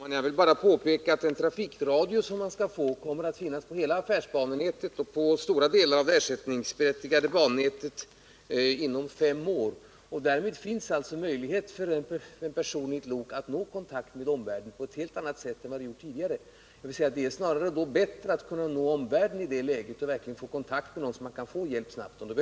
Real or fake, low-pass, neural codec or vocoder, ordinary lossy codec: real; none; none; none